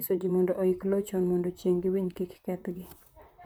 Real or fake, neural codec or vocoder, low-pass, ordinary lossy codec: fake; vocoder, 44.1 kHz, 128 mel bands, Pupu-Vocoder; none; none